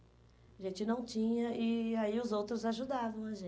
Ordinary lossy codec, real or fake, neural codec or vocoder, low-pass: none; real; none; none